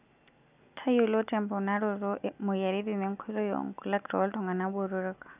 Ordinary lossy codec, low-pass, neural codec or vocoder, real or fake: none; 3.6 kHz; none; real